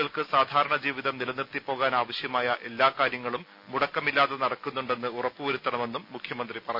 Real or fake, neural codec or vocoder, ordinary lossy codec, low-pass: real; none; none; 5.4 kHz